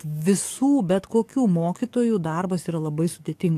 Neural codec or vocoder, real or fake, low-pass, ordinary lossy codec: codec, 44.1 kHz, 7.8 kbps, DAC; fake; 14.4 kHz; AAC, 64 kbps